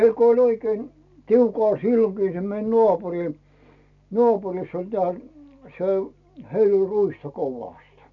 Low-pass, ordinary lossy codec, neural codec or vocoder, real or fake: 7.2 kHz; none; none; real